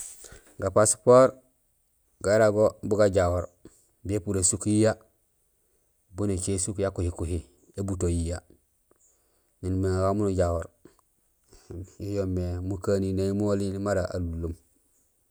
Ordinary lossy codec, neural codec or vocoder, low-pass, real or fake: none; none; none; real